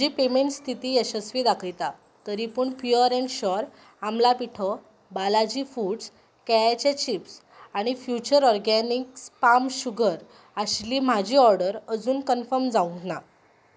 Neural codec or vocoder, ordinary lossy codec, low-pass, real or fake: none; none; none; real